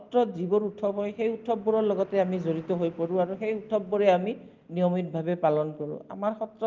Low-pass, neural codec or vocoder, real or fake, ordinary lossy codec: 7.2 kHz; none; real; Opus, 32 kbps